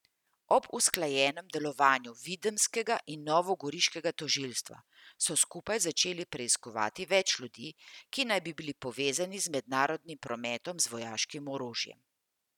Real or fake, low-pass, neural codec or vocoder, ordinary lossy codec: real; 19.8 kHz; none; none